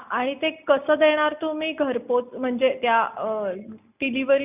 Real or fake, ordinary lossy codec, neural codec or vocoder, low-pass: real; none; none; 3.6 kHz